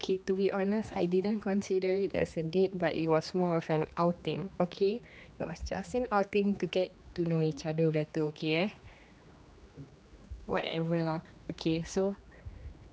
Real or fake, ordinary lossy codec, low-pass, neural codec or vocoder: fake; none; none; codec, 16 kHz, 2 kbps, X-Codec, HuBERT features, trained on general audio